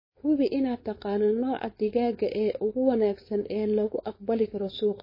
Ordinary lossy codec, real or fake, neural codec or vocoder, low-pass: MP3, 24 kbps; fake; codec, 16 kHz, 4.8 kbps, FACodec; 5.4 kHz